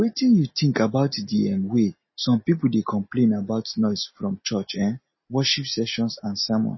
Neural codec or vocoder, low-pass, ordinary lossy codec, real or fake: none; 7.2 kHz; MP3, 24 kbps; real